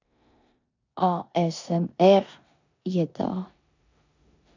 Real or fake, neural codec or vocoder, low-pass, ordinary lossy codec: fake; codec, 16 kHz in and 24 kHz out, 0.9 kbps, LongCat-Audio-Codec, four codebook decoder; 7.2 kHz; AAC, 48 kbps